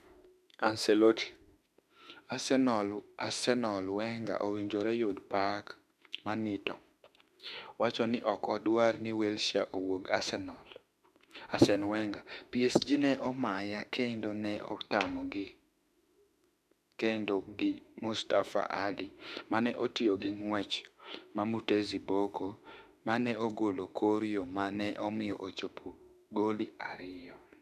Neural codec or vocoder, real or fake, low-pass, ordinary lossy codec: autoencoder, 48 kHz, 32 numbers a frame, DAC-VAE, trained on Japanese speech; fake; 14.4 kHz; none